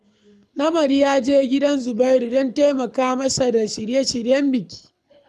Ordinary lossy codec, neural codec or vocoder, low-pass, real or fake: none; codec, 24 kHz, 6 kbps, HILCodec; none; fake